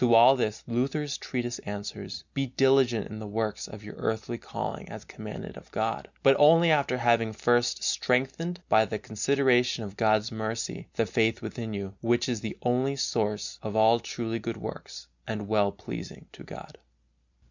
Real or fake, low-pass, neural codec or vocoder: real; 7.2 kHz; none